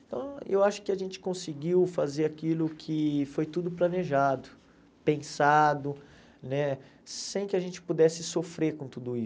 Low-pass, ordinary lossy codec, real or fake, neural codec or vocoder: none; none; real; none